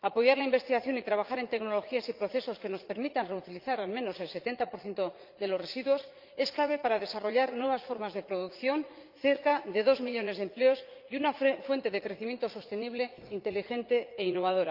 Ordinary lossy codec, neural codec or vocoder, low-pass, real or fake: Opus, 32 kbps; vocoder, 44.1 kHz, 80 mel bands, Vocos; 5.4 kHz; fake